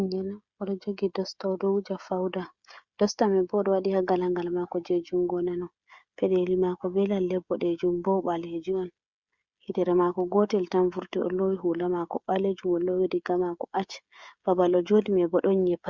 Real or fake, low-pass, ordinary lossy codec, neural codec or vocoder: fake; 7.2 kHz; Opus, 64 kbps; codec, 16 kHz, 16 kbps, FreqCodec, smaller model